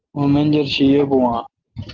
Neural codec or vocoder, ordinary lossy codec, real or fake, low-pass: none; Opus, 16 kbps; real; 7.2 kHz